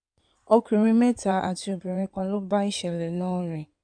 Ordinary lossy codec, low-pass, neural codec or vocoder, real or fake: none; 9.9 kHz; codec, 16 kHz in and 24 kHz out, 2.2 kbps, FireRedTTS-2 codec; fake